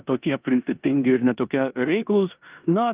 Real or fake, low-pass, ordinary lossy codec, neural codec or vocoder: fake; 3.6 kHz; Opus, 24 kbps; codec, 16 kHz in and 24 kHz out, 0.9 kbps, LongCat-Audio-Codec, four codebook decoder